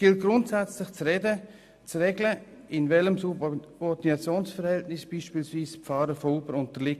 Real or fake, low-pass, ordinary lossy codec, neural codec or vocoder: real; 14.4 kHz; AAC, 96 kbps; none